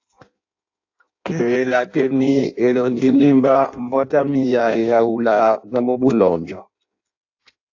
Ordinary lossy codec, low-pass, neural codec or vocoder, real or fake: AAC, 48 kbps; 7.2 kHz; codec, 16 kHz in and 24 kHz out, 0.6 kbps, FireRedTTS-2 codec; fake